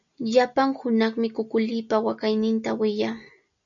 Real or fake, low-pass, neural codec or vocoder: real; 7.2 kHz; none